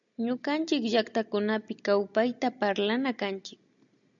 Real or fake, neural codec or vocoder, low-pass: real; none; 7.2 kHz